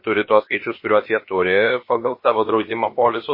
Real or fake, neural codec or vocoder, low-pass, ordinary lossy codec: fake; codec, 16 kHz, about 1 kbps, DyCAST, with the encoder's durations; 5.4 kHz; MP3, 24 kbps